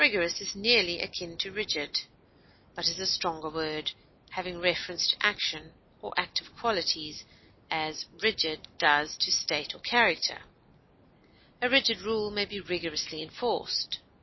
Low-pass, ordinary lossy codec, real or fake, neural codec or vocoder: 7.2 kHz; MP3, 24 kbps; real; none